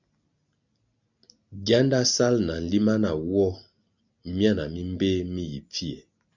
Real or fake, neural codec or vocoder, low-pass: real; none; 7.2 kHz